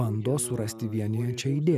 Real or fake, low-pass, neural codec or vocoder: real; 14.4 kHz; none